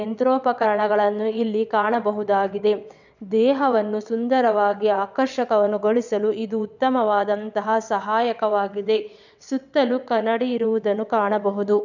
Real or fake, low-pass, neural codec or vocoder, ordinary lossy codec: fake; 7.2 kHz; vocoder, 22.05 kHz, 80 mel bands, WaveNeXt; none